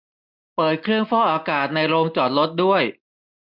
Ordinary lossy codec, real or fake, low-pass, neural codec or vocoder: none; real; 5.4 kHz; none